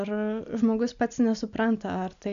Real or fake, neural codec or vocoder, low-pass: real; none; 7.2 kHz